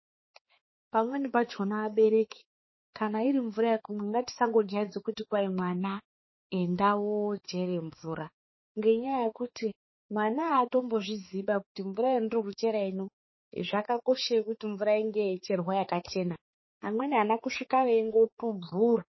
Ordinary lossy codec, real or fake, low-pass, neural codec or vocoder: MP3, 24 kbps; fake; 7.2 kHz; codec, 16 kHz, 4 kbps, X-Codec, HuBERT features, trained on balanced general audio